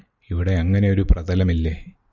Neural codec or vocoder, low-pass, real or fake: none; 7.2 kHz; real